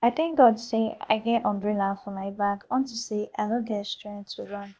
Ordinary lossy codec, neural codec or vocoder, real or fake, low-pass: none; codec, 16 kHz, 0.8 kbps, ZipCodec; fake; none